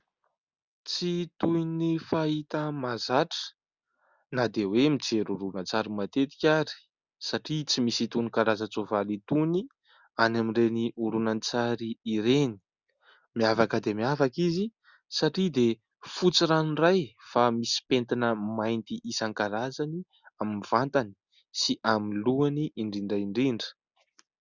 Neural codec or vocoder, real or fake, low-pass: none; real; 7.2 kHz